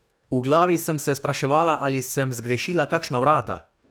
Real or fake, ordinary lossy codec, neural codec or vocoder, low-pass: fake; none; codec, 44.1 kHz, 2.6 kbps, DAC; none